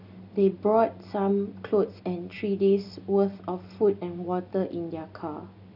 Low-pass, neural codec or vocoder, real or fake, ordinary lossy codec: 5.4 kHz; none; real; none